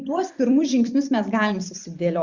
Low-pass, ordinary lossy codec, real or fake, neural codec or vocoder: 7.2 kHz; Opus, 64 kbps; fake; vocoder, 44.1 kHz, 128 mel bands every 256 samples, BigVGAN v2